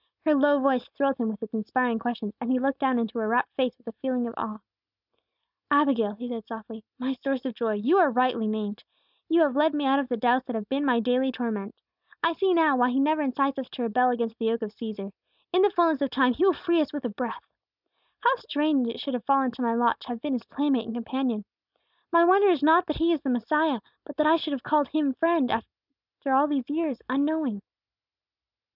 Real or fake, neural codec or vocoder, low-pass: real; none; 5.4 kHz